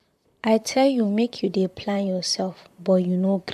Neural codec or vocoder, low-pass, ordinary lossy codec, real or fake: vocoder, 44.1 kHz, 128 mel bands, Pupu-Vocoder; 14.4 kHz; MP3, 96 kbps; fake